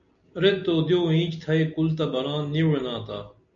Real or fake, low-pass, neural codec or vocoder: real; 7.2 kHz; none